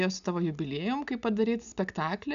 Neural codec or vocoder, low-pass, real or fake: none; 7.2 kHz; real